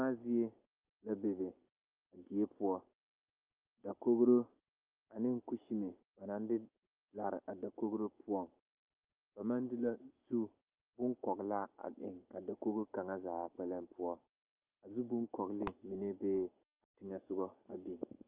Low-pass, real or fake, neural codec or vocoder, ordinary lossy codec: 3.6 kHz; real; none; Opus, 24 kbps